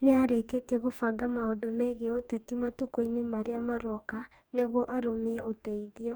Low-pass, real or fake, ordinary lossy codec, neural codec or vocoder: none; fake; none; codec, 44.1 kHz, 2.6 kbps, DAC